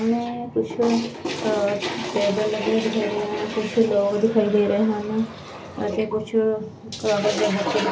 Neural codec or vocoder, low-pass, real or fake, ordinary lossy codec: none; none; real; none